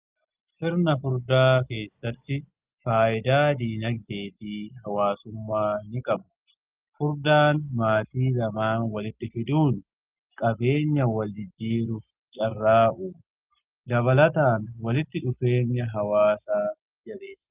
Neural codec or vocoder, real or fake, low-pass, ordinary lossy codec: none; real; 3.6 kHz; Opus, 32 kbps